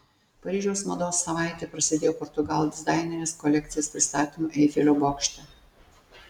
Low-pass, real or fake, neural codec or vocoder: 19.8 kHz; real; none